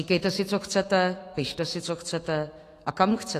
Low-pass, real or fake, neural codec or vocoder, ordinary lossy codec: 14.4 kHz; fake; autoencoder, 48 kHz, 128 numbers a frame, DAC-VAE, trained on Japanese speech; AAC, 48 kbps